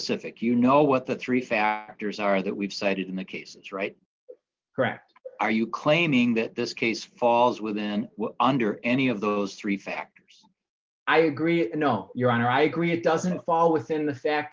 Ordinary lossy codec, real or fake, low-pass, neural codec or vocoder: Opus, 32 kbps; real; 7.2 kHz; none